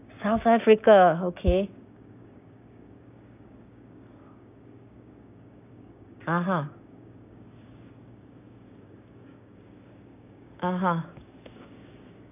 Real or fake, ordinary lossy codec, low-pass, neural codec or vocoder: fake; none; 3.6 kHz; codec, 16 kHz, 6 kbps, DAC